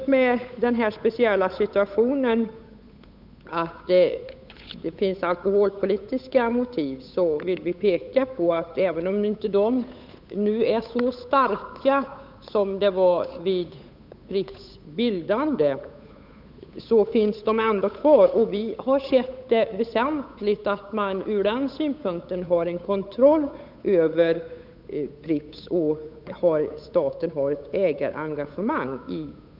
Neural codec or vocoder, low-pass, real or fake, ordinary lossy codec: codec, 16 kHz, 8 kbps, FunCodec, trained on Chinese and English, 25 frames a second; 5.4 kHz; fake; none